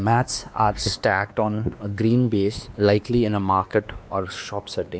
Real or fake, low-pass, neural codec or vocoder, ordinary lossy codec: fake; none; codec, 16 kHz, 2 kbps, X-Codec, WavLM features, trained on Multilingual LibriSpeech; none